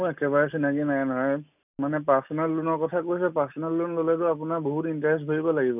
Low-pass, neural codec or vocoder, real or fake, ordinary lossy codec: 3.6 kHz; none; real; none